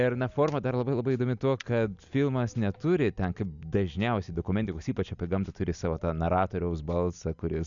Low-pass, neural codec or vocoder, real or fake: 7.2 kHz; none; real